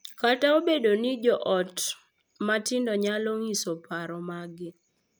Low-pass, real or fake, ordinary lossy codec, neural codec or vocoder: none; real; none; none